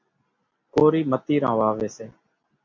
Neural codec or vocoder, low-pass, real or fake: none; 7.2 kHz; real